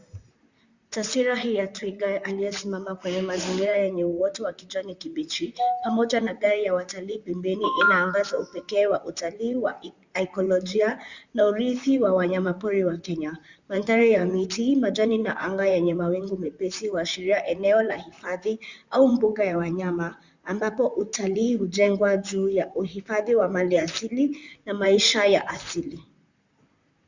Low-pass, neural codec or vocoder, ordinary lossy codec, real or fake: 7.2 kHz; vocoder, 44.1 kHz, 128 mel bands, Pupu-Vocoder; Opus, 64 kbps; fake